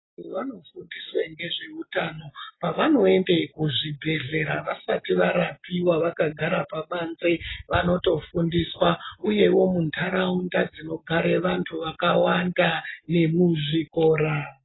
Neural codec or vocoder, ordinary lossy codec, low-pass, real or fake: none; AAC, 16 kbps; 7.2 kHz; real